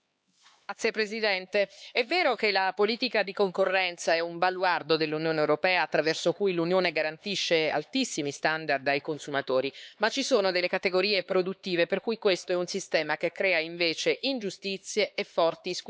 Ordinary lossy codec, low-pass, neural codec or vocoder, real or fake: none; none; codec, 16 kHz, 4 kbps, X-Codec, HuBERT features, trained on LibriSpeech; fake